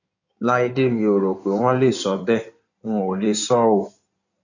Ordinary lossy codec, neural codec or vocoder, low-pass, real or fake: none; codec, 16 kHz in and 24 kHz out, 2.2 kbps, FireRedTTS-2 codec; 7.2 kHz; fake